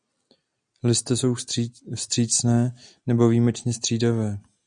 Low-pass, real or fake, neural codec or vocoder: 9.9 kHz; real; none